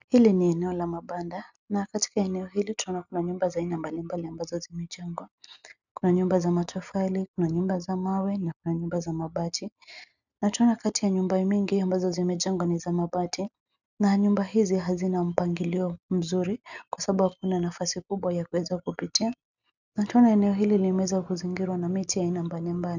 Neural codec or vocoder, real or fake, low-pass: none; real; 7.2 kHz